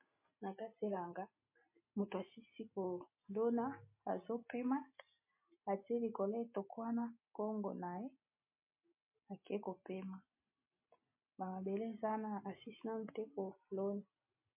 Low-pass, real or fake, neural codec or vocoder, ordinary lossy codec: 3.6 kHz; real; none; MP3, 24 kbps